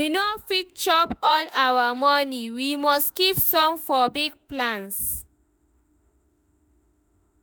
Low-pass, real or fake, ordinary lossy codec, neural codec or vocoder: none; fake; none; autoencoder, 48 kHz, 32 numbers a frame, DAC-VAE, trained on Japanese speech